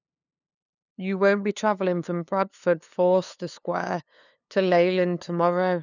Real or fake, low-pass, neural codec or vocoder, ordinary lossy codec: fake; 7.2 kHz; codec, 16 kHz, 2 kbps, FunCodec, trained on LibriTTS, 25 frames a second; none